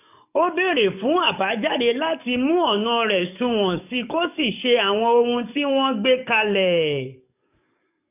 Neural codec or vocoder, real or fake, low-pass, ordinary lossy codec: codec, 44.1 kHz, 7.8 kbps, DAC; fake; 3.6 kHz; none